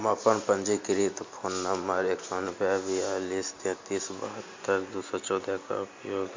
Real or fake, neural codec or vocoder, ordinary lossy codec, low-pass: real; none; MP3, 48 kbps; 7.2 kHz